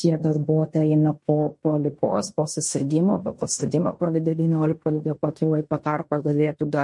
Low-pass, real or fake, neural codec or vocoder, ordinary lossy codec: 10.8 kHz; fake; codec, 16 kHz in and 24 kHz out, 0.9 kbps, LongCat-Audio-Codec, fine tuned four codebook decoder; MP3, 48 kbps